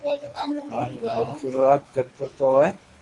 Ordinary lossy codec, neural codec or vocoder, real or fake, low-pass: AAC, 48 kbps; codec, 24 kHz, 3 kbps, HILCodec; fake; 10.8 kHz